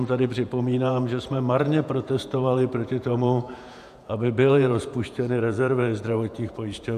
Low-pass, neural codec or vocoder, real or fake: 14.4 kHz; vocoder, 48 kHz, 128 mel bands, Vocos; fake